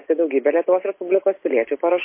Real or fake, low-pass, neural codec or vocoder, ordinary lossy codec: real; 3.6 kHz; none; MP3, 24 kbps